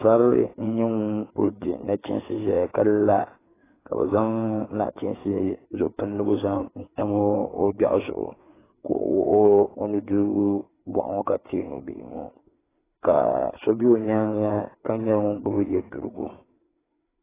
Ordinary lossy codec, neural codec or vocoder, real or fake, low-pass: AAC, 16 kbps; codec, 16 kHz, 4 kbps, FreqCodec, larger model; fake; 3.6 kHz